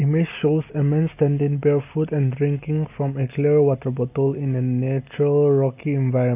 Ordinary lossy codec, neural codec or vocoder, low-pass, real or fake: AAC, 32 kbps; none; 3.6 kHz; real